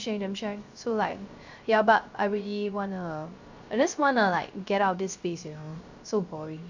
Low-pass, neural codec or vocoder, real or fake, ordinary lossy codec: 7.2 kHz; codec, 16 kHz, 0.3 kbps, FocalCodec; fake; Opus, 64 kbps